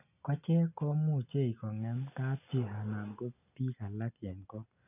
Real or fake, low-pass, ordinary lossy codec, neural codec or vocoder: real; 3.6 kHz; none; none